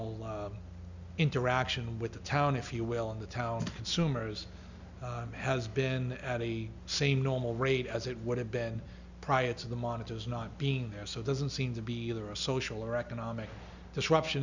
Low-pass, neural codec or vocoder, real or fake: 7.2 kHz; none; real